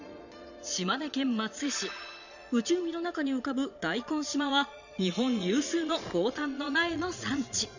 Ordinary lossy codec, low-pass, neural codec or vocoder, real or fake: none; 7.2 kHz; vocoder, 22.05 kHz, 80 mel bands, Vocos; fake